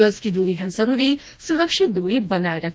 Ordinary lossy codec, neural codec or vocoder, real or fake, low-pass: none; codec, 16 kHz, 1 kbps, FreqCodec, smaller model; fake; none